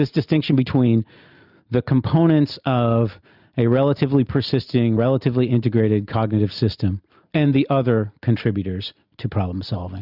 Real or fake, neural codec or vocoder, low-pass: real; none; 5.4 kHz